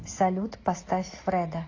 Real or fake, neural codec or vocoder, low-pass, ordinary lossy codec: real; none; 7.2 kHz; AAC, 32 kbps